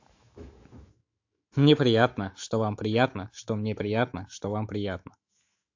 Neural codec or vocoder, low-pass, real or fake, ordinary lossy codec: autoencoder, 48 kHz, 128 numbers a frame, DAC-VAE, trained on Japanese speech; 7.2 kHz; fake; AAC, 48 kbps